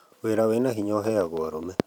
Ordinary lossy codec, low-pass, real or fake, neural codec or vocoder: none; 19.8 kHz; fake; vocoder, 44.1 kHz, 128 mel bands every 512 samples, BigVGAN v2